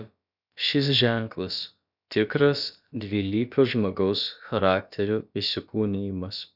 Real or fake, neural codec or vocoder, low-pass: fake; codec, 16 kHz, about 1 kbps, DyCAST, with the encoder's durations; 5.4 kHz